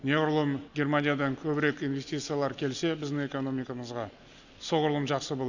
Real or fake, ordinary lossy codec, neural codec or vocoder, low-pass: real; none; none; 7.2 kHz